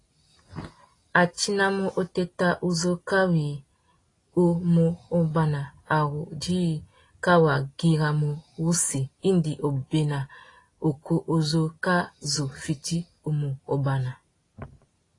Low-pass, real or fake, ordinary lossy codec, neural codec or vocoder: 10.8 kHz; real; AAC, 32 kbps; none